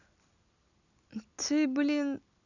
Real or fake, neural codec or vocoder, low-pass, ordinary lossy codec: real; none; 7.2 kHz; none